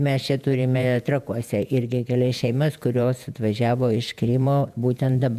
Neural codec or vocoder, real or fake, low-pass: vocoder, 48 kHz, 128 mel bands, Vocos; fake; 14.4 kHz